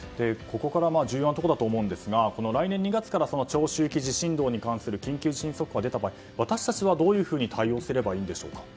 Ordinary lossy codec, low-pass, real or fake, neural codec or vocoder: none; none; real; none